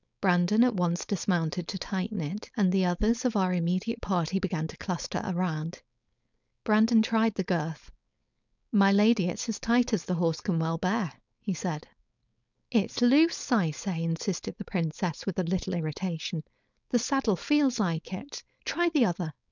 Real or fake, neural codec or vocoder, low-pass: fake; codec, 16 kHz, 4.8 kbps, FACodec; 7.2 kHz